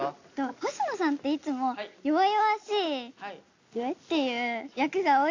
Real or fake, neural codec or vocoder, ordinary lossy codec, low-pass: real; none; AAC, 48 kbps; 7.2 kHz